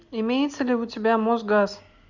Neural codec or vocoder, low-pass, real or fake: none; 7.2 kHz; real